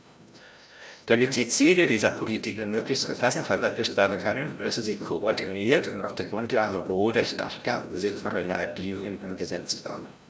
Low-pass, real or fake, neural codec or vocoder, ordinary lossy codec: none; fake; codec, 16 kHz, 0.5 kbps, FreqCodec, larger model; none